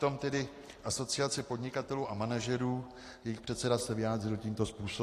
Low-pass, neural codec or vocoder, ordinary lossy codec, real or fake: 14.4 kHz; none; AAC, 48 kbps; real